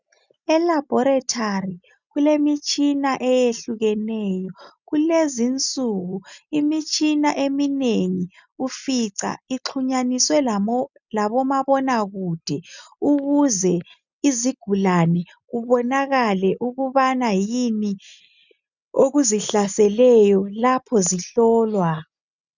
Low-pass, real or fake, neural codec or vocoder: 7.2 kHz; real; none